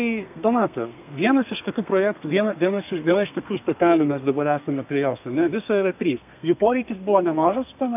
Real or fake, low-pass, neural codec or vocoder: fake; 3.6 kHz; codec, 32 kHz, 1.9 kbps, SNAC